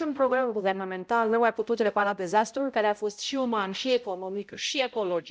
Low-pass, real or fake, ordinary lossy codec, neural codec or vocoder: none; fake; none; codec, 16 kHz, 0.5 kbps, X-Codec, HuBERT features, trained on balanced general audio